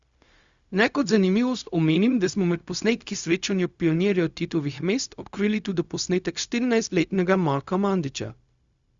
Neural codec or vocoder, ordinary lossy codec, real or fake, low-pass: codec, 16 kHz, 0.4 kbps, LongCat-Audio-Codec; Opus, 64 kbps; fake; 7.2 kHz